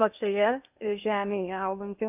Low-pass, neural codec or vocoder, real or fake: 3.6 kHz; codec, 16 kHz in and 24 kHz out, 0.8 kbps, FocalCodec, streaming, 65536 codes; fake